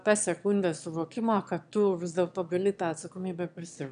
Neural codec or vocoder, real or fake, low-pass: autoencoder, 22.05 kHz, a latent of 192 numbers a frame, VITS, trained on one speaker; fake; 9.9 kHz